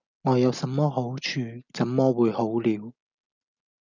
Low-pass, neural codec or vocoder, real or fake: 7.2 kHz; none; real